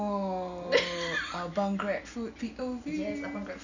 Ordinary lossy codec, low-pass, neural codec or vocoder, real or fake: none; 7.2 kHz; none; real